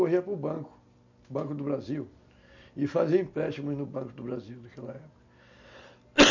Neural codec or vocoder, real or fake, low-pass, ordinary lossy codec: none; real; 7.2 kHz; none